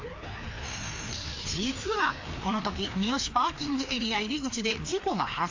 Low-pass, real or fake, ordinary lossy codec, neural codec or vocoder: 7.2 kHz; fake; AAC, 48 kbps; codec, 16 kHz, 2 kbps, FreqCodec, larger model